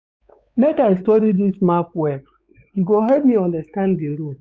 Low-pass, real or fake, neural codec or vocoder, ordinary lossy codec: none; fake; codec, 16 kHz, 4 kbps, X-Codec, WavLM features, trained on Multilingual LibriSpeech; none